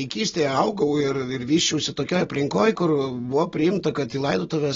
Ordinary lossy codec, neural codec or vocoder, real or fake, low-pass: AAC, 24 kbps; none; real; 7.2 kHz